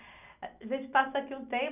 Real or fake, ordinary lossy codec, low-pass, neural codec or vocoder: real; none; 3.6 kHz; none